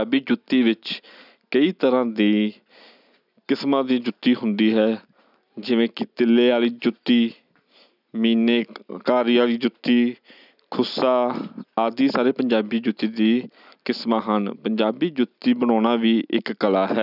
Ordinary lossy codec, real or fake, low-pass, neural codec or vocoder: none; real; 5.4 kHz; none